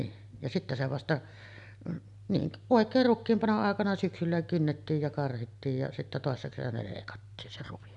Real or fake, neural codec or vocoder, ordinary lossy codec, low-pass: real; none; none; 10.8 kHz